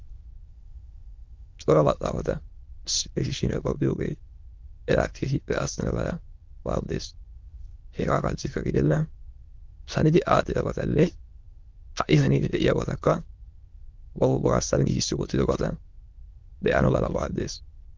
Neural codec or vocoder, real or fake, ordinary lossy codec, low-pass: autoencoder, 22.05 kHz, a latent of 192 numbers a frame, VITS, trained on many speakers; fake; Opus, 32 kbps; 7.2 kHz